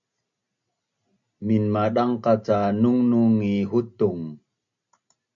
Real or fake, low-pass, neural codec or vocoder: real; 7.2 kHz; none